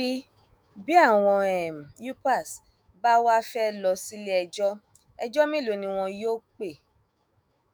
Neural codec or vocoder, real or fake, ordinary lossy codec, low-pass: autoencoder, 48 kHz, 128 numbers a frame, DAC-VAE, trained on Japanese speech; fake; none; none